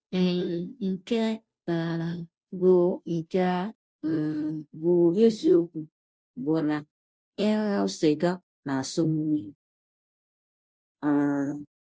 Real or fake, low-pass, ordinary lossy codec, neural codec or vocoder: fake; none; none; codec, 16 kHz, 0.5 kbps, FunCodec, trained on Chinese and English, 25 frames a second